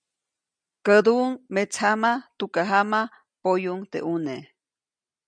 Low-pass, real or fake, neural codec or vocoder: 9.9 kHz; real; none